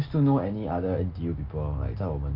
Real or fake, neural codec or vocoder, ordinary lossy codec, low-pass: real; none; Opus, 24 kbps; 5.4 kHz